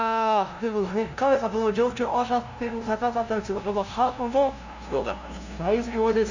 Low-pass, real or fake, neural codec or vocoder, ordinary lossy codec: 7.2 kHz; fake; codec, 16 kHz, 0.5 kbps, FunCodec, trained on LibriTTS, 25 frames a second; none